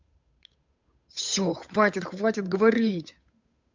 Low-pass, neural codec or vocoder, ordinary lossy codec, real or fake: 7.2 kHz; codec, 16 kHz, 8 kbps, FunCodec, trained on Chinese and English, 25 frames a second; MP3, 64 kbps; fake